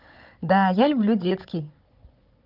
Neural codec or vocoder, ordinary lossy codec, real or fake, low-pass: codec, 16 kHz, 16 kbps, FunCodec, trained on Chinese and English, 50 frames a second; Opus, 24 kbps; fake; 5.4 kHz